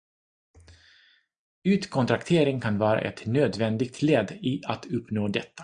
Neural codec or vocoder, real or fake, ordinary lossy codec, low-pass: none; real; MP3, 96 kbps; 9.9 kHz